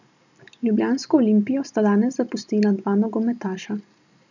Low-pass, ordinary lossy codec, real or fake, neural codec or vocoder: 7.2 kHz; none; real; none